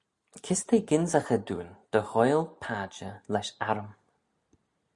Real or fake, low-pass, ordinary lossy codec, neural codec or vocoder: real; 10.8 kHz; Opus, 64 kbps; none